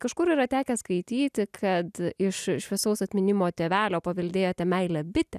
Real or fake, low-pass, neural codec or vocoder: real; 14.4 kHz; none